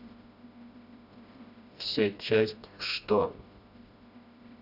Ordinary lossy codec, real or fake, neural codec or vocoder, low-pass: Opus, 64 kbps; fake; codec, 16 kHz, 1 kbps, FreqCodec, smaller model; 5.4 kHz